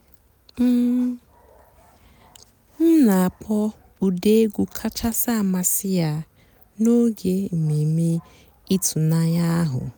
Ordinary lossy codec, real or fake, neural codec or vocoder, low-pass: none; real; none; none